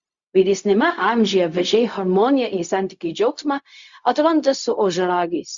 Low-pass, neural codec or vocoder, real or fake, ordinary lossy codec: 7.2 kHz; codec, 16 kHz, 0.4 kbps, LongCat-Audio-Codec; fake; Opus, 64 kbps